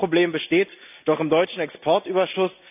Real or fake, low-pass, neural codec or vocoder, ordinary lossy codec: real; 3.6 kHz; none; none